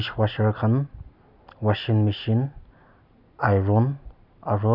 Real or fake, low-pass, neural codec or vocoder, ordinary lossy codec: real; 5.4 kHz; none; none